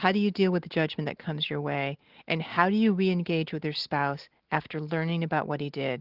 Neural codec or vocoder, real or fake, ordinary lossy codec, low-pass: none; real; Opus, 16 kbps; 5.4 kHz